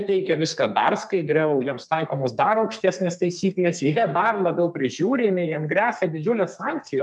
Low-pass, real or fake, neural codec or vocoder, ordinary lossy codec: 10.8 kHz; fake; codec, 32 kHz, 1.9 kbps, SNAC; MP3, 96 kbps